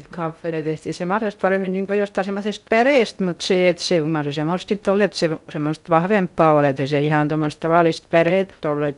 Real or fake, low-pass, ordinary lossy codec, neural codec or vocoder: fake; 10.8 kHz; none; codec, 16 kHz in and 24 kHz out, 0.6 kbps, FocalCodec, streaming, 2048 codes